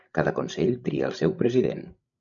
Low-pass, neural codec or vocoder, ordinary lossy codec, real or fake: 7.2 kHz; codec, 16 kHz, 16 kbps, FreqCodec, larger model; MP3, 96 kbps; fake